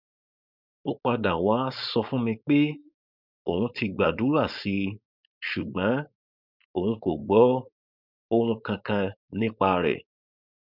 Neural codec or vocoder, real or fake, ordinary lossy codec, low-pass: codec, 16 kHz, 4.8 kbps, FACodec; fake; none; 5.4 kHz